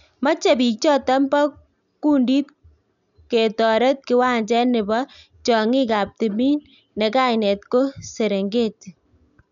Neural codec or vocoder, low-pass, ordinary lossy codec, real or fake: none; 7.2 kHz; none; real